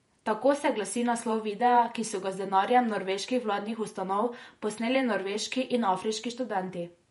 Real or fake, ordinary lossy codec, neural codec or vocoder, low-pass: fake; MP3, 48 kbps; vocoder, 48 kHz, 128 mel bands, Vocos; 19.8 kHz